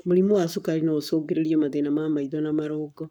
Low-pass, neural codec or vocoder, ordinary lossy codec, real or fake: 19.8 kHz; codec, 44.1 kHz, 7.8 kbps, Pupu-Codec; none; fake